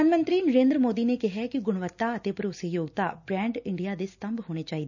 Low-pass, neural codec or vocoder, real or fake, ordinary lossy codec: 7.2 kHz; none; real; none